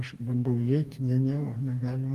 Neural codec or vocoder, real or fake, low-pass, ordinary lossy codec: codec, 44.1 kHz, 2.6 kbps, DAC; fake; 14.4 kHz; Opus, 32 kbps